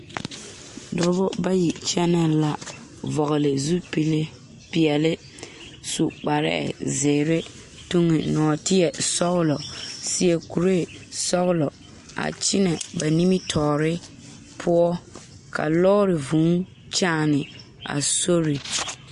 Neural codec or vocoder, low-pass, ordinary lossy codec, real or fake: none; 14.4 kHz; MP3, 48 kbps; real